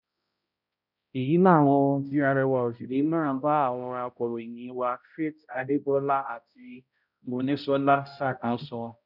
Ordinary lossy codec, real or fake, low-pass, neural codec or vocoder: none; fake; 5.4 kHz; codec, 16 kHz, 0.5 kbps, X-Codec, HuBERT features, trained on balanced general audio